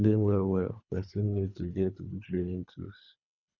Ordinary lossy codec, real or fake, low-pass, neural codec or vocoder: none; fake; 7.2 kHz; codec, 24 kHz, 3 kbps, HILCodec